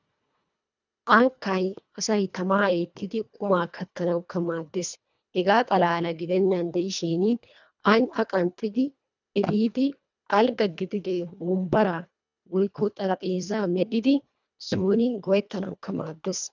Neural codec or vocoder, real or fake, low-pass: codec, 24 kHz, 1.5 kbps, HILCodec; fake; 7.2 kHz